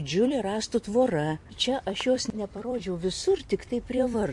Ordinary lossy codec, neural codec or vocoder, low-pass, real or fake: MP3, 48 kbps; vocoder, 44.1 kHz, 128 mel bands every 256 samples, BigVGAN v2; 10.8 kHz; fake